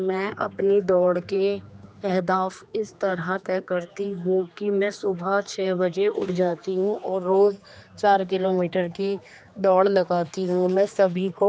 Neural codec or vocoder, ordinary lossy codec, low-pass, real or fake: codec, 16 kHz, 2 kbps, X-Codec, HuBERT features, trained on general audio; none; none; fake